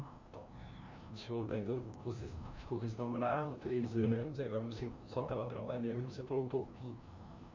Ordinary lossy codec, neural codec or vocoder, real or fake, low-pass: MP3, 64 kbps; codec, 16 kHz, 1 kbps, FreqCodec, larger model; fake; 7.2 kHz